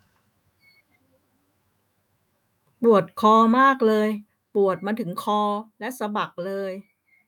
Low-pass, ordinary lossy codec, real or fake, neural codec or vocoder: 19.8 kHz; none; fake; autoencoder, 48 kHz, 128 numbers a frame, DAC-VAE, trained on Japanese speech